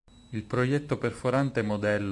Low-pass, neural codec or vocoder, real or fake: 10.8 kHz; none; real